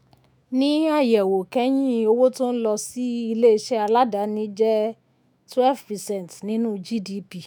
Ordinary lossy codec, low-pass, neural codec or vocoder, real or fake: none; none; autoencoder, 48 kHz, 128 numbers a frame, DAC-VAE, trained on Japanese speech; fake